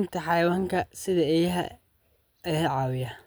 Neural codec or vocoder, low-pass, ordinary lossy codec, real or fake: none; none; none; real